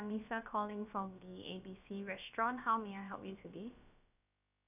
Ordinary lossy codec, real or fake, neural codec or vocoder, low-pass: none; fake; codec, 16 kHz, about 1 kbps, DyCAST, with the encoder's durations; 3.6 kHz